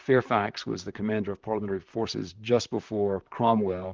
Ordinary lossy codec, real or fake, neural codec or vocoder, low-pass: Opus, 24 kbps; fake; vocoder, 44.1 kHz, 128 mel bands, Pupu-Vocoder; 7.2 kHz